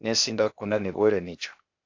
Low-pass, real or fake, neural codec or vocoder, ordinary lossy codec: 7.2 kHz; fake; codec, 16 kHz, 0.8 kbps, ZipCodec; AAC, 48 kbps